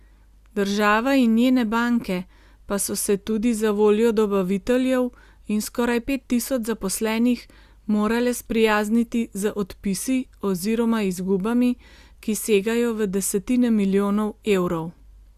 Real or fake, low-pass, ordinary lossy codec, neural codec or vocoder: real; 14.4 kHz; Opus, 64 kbps; none